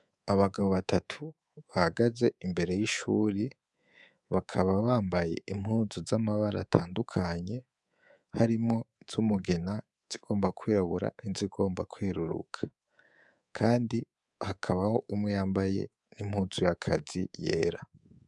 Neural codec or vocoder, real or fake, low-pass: codec, 24 kHz, 3.1 kbps, DualCodec; fake; 10.8 kHz